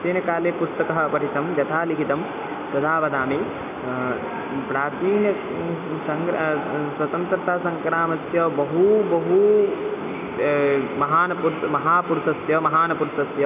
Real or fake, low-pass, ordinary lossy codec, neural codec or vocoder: real; 3.6 kHz; none; none